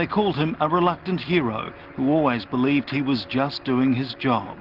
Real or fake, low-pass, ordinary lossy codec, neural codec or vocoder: real; 5.4 kHz; Opus, 16 kbps; none